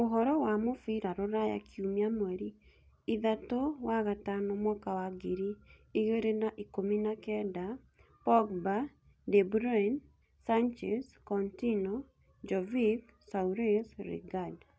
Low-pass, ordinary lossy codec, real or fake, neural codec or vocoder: none; none; real; none